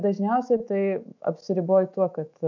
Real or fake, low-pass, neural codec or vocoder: fake; 7.2 kHz; autoencoder, 48 kHz, 128 numbers a frame, DAC-VAE, trained on Japanese speech